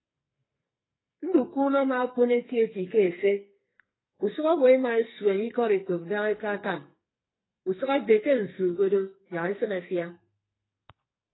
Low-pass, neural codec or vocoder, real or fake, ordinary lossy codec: 7.2 kHz; codec, 44.1 kHz, 2.6 kbps, SNAC; fake; AAC, 16 kbps